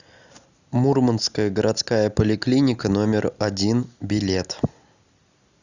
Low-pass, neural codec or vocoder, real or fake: 7.2 kHz; none; real